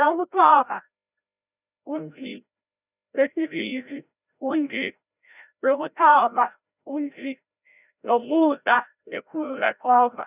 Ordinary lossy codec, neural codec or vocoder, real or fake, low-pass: none; codec, 16 kHz, 0.5 kbps, FreqCodec, larger model; fake; 3.6 kHz